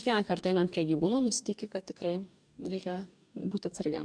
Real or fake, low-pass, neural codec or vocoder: fake; 9.9 kHz; codec, 44.1 kHz, 2.6 kbps, DAC